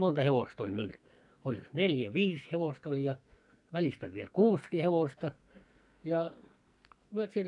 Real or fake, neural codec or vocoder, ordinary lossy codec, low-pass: fake; codec, 44.1 kHz, 2.6 kbps, SNAC; none; 10.8 kHz